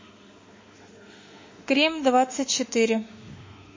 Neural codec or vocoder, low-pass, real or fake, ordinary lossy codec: autoencoder, 48 kHz, 32 numbers a frame, DAC-VAE, trained on Japanese speech; 7.2 kHz; fake; MP3, 32 kbps